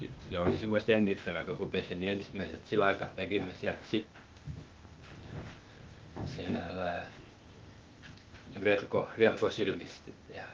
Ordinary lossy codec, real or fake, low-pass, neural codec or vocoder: Opus, 24 kbps; fake; 7.2 kHz; codec, 16 kHz, 0.8 kbps, ZipCodec